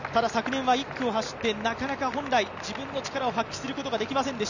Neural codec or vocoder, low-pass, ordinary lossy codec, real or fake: none; 7.2 kHz; none; real